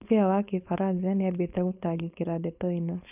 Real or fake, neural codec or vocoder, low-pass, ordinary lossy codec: fake; codec, 16 kHz, 4.8 kbps, FACodec; 3.6 kHz; none